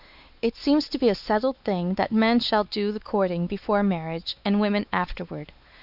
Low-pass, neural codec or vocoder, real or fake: 5.4 kHz; codec, 16 kHz, 4 kbps, X-Codec, WavLM features, trained on Multilingual LibriSpeech; fake